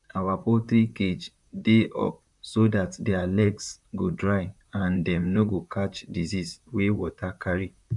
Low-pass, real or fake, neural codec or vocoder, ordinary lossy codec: 10.8 kHz; fake; vocoder, 44.1 kHz, 128 mel bands, Pupu-Vocoder; none